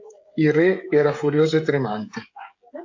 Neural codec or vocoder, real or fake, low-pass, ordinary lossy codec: codec, 16 kHz, 16 kbps, FreqCodec, smaller model; fake; 7.2 kHz; AAC, 48 kbps